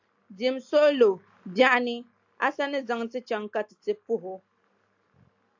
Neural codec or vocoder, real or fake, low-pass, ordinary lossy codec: none; real; 7.2 kHz; AAC, 48 kbps